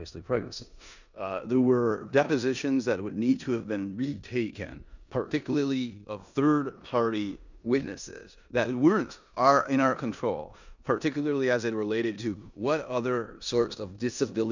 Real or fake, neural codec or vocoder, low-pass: fake; codec, 16 kHz in and 24 kHz out, 0.9 kbps, LongCat-Audio-Codec, four codebook decoder; 7.2 kHz